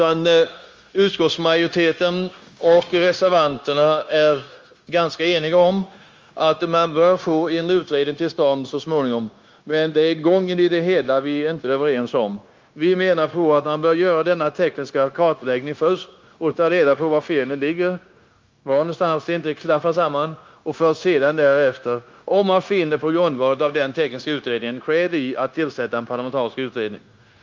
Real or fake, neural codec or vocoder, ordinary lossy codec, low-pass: fake; codec, 16 kHz, 0.9 kbps, LongCat-Audio-Codec; Opus, 32 kbps; 7.2 kHz